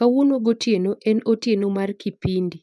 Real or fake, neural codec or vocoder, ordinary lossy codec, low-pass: fake; vocoder, 24 kHz, 100 mel bands, Vocos; none; none